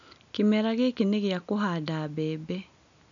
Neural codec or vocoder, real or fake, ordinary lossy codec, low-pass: none; real; none; 7.2 kHz